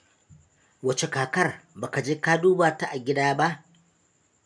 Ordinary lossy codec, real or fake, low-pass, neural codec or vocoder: none; real; 9.9 kHz; none